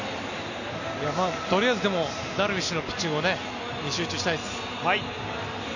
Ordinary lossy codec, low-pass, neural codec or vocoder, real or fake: none; 7.2 kHz; none; real